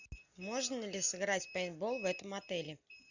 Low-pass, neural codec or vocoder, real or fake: 7.2 kHz; none; real